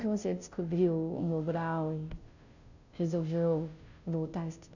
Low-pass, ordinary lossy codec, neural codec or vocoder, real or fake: 7.2 kHz; none; codec, 16 kHz, 0.5 kbps, FunCodec, trained on Chinese and English, 25 frames a second; fake